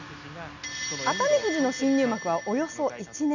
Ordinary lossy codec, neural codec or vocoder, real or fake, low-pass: none; none; real; 7.2 kHz